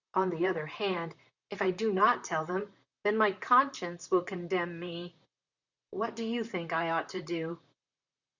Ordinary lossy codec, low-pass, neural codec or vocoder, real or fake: Opus, 64 kbps; 7.2 kHz; vocoder, 44.1 kHz, 128 mel bands, Pupu-Vocoder; fake